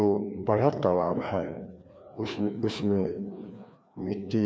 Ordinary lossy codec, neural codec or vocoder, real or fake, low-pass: none; codec, 16 kHz, 2 kbps, FreqCodec, larger model; fake; none